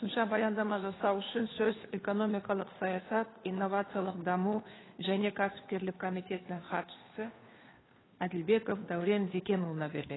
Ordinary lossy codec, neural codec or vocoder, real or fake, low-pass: AAC, 16 kbps; codec, 16 kHz, 2 kbps, FunCodec, trained on Chinese and English, 25 frames a second; fake; 7.2 kHz